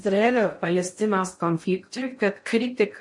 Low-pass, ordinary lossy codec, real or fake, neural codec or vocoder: 10.8 kHz; MP3, 48 kbps; fake; codec, 16 kHz in and 24 kHz out, 0.6 kbps, FocalCodec, streaming, 2048 codes